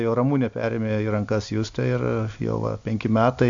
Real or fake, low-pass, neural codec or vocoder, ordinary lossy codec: real; 7.2 kHz; none; AAC, 64 kbps